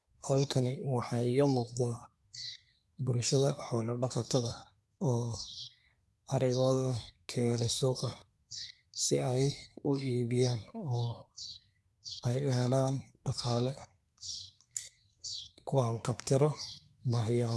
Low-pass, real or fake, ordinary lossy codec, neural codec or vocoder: none; fake; none; codec, 24 kHz, 1 kbps, SNAC